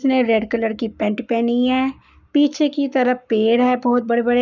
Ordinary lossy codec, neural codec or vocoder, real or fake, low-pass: none; codec, 44.1 kHz, 7.8 kbps, Pupu-Codec; fake; 7.2 kHz